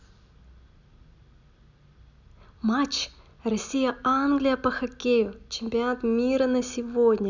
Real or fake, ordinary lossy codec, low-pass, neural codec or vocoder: real; none; 7.2 kHz; none